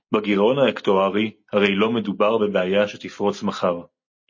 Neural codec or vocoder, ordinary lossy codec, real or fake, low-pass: none; MP3, 32 kbps; real; 7.2 kHz